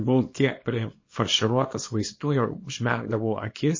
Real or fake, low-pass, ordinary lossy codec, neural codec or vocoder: fake; 7.2 kHz; MP3, 32 kbps; codec, 24 kHz, 0.9 kbps, WavTokenizer, small release